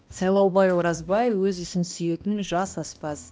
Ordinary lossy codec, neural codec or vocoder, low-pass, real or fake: none; codec, 16 kHz, 1 kbps, X-Codec, HuBERT features, trained on balanced general audio; none; fake